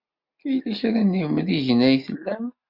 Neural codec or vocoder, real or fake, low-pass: none; real; 5.4 kHz